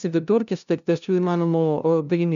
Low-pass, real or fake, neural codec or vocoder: 7.2 kHz; fake; codec, 16 kHz, 0.5 kbps, FunCodec, trained on LibriTTS, 25 frames a second